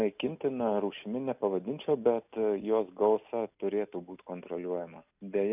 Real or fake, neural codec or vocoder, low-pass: real; none; 3.6 kHz